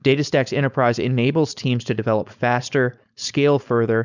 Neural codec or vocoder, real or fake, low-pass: codec, 16 kHz, 4.8 kbps, FACodec; fake; 7.2 kHz